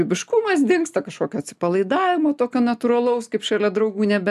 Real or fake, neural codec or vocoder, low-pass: real; none; 14.4 kHz